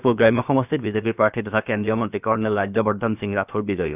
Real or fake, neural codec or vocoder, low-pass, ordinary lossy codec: fake; codec, 16 kHz, about 1 kbps, DyCAST, with the encoder's durations; 3.6 kHz; none